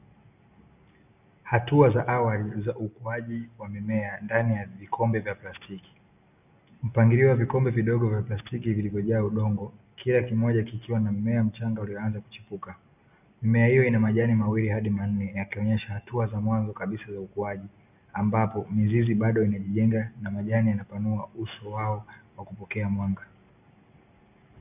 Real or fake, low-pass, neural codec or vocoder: real; 3.6 kHz; none